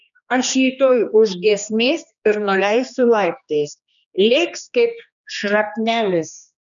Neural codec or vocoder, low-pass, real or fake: codec, 16 kHz, 2 kbps, X-Codec, HuBERT features, trained on general audio; 7.2 kHz; fake